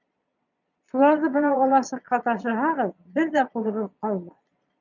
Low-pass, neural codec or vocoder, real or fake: 7.2 kHz; vocoder, 22.05 kHz, 80 mel bands, Vocos; fake